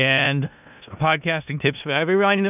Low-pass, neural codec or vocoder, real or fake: 3.6 kHz; codec, 16 kHz in and 24 kHz out, 0.4 kbps, LongCat-Audio-Codec, four codebook decoder; fake